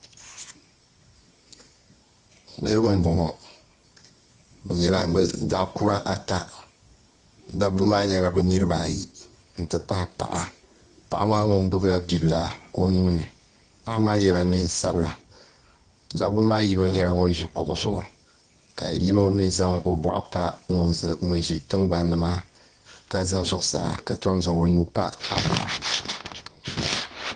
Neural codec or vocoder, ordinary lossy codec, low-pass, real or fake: codec, 24 kHz, 0.9 kbps, WavTokenizer, medium music audio release; Opus, 32 kbps; 10.8 kHz; fake